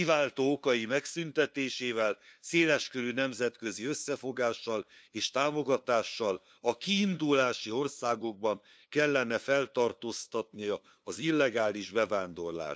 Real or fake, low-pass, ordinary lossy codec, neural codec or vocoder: fake; none; none; codec, 16 kHz, 2 kbps, FunCodec, trained on LibriTTS, 25 frames a second